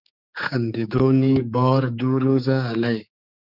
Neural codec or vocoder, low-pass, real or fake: codec, 16 kHz, 4 kbps, X-Codec, HuBERT features, trained on general audio; 5.4 kHz; fake